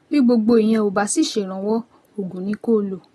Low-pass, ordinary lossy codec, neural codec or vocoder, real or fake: 19.8 kHz; AAC, 32 kbps; none; real